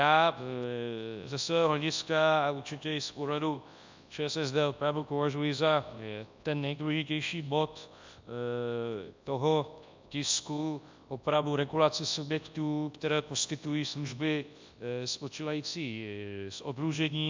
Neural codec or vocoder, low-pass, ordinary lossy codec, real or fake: codec, 24 kHz, 0.9 kbps, WavTokenizer, large speech release; 7.2 kHz; MP3, 64 kbps; fake